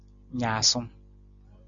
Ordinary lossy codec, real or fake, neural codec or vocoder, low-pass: AAC, 64 kbps; real; none; 7.2 kHz